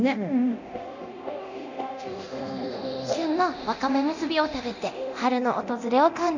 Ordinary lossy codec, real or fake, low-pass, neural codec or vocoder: none; fake; 7.2 kHz; codec, 24 kHz, 0.9 kbps, DualCodec